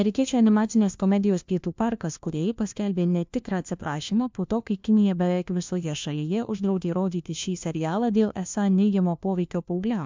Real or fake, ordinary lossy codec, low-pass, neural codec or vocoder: fake; MP3, 64 kbps; 7.2 kHz; codec, 16 kHz, 1 kbps, FunCodec, trained on LibriTTS, 50 frames a second